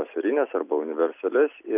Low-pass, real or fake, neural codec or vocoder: 3.6 kHz; real; none